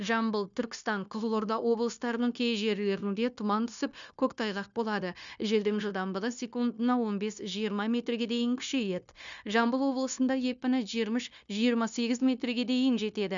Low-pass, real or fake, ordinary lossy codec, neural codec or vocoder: 7.2 kHz; fake; MP3, 96 kbps; codec, 16 kHz, 0.9 kbps, LongCat-Audio-Codec